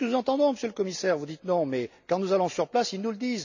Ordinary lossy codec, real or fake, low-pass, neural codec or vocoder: none; real; 7.2 kHz; none